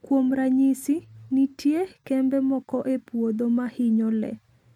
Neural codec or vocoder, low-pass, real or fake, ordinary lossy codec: none; 19.8 kHz; real; MP3, 96 kbps